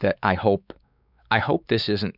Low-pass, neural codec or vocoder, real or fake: 5.4 kHz; codec, 16 kHz, 4 kbps, X-Codec, WavLM features, trained on Multilingual LibriSpeech; fake